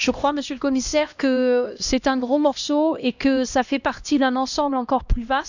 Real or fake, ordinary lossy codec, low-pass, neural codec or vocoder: fake; none; 7.2 kHz; codec, 16 kHz, 1 kbps, X-Codec, HuBERT features, trained on LibriSpeech